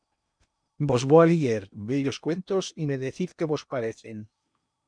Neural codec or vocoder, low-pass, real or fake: codec, 16 kHz in and 24 kHz out, 0.8 kbps, FocalCodec, streaming, 65536 codes; 9.9 kHz; fake